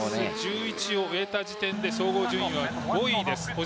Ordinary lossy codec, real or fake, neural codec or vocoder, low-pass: none; real; none; none